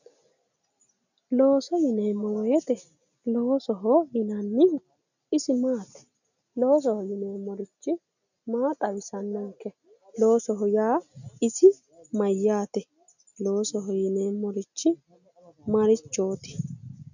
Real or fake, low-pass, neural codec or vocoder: real; 7.2 kHz; none